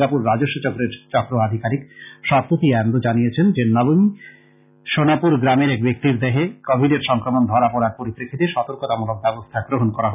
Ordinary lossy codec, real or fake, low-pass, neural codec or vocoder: none; real; 3.6 kHz; none